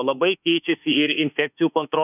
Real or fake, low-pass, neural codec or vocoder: fake; 3.6 kHz; autoencoder, 48 kHz, 32 numbers a frame, DAC-VAE, trained on Japanese speech